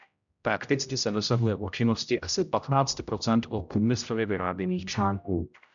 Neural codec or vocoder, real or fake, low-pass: codec, 16 kHz, 0.5 kbps, X-Codec, HuBERT features, trained on general audio; fake; 7.2 kHz